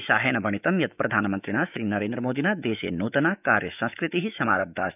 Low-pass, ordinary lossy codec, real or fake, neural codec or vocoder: 3.6 kHz; none; fake; autoencoder, 48 kHz, 128 numbers a frame, DAC-VAE, trained on Japanese speech